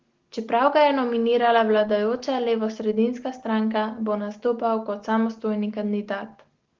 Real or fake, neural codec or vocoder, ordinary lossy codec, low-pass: real; none; Opus, 16 kbps; 7.2 kHz